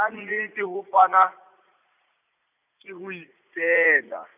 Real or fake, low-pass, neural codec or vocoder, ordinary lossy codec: fake; 3.6 kHz; vocoder, 44.1 kHz, 80 mel bands, Vocos; none